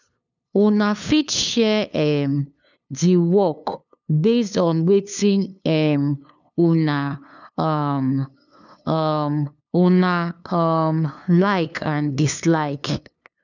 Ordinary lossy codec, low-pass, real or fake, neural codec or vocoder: none; 7.2 kHz; fake; codec, 16 kHz, 2 kbps, FunCodec, trained on LibriTTS, 25 frames a second